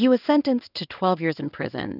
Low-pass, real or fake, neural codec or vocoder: 5.4 kHz; fake; codec, 16 kHz in and 24 kHz out, 1 kbps, XY-Tokenizer